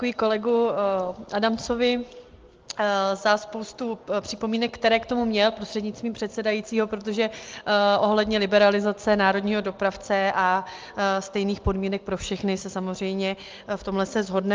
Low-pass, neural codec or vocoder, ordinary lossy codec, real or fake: 7.2 kHz; none; Opus, 24 kbps; real